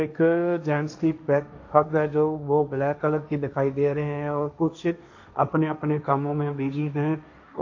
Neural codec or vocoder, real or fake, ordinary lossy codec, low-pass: codec, 16 kHz, 1.1 kbps, Voila-Tokenizer; fake; AAC, 48 kbps; 7.2 kHz